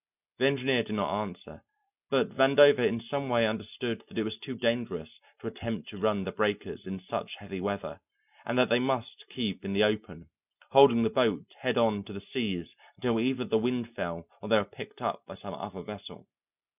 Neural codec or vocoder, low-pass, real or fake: none; 3.6 kHz; real